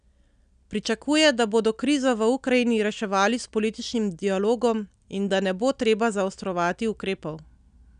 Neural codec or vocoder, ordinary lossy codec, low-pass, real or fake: none; none; 9.9 kHz; real